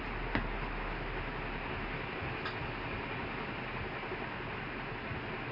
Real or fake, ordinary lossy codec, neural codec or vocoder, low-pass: real; MP3, 32 kbps; none; 5.4 kHz